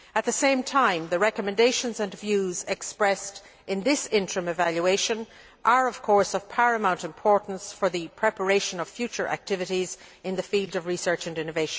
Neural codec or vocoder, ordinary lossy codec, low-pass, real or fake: none; none; none; real